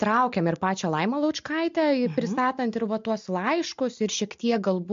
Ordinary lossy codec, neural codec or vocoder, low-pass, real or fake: MP3, 48 kbps; none; 7.2 kHz; real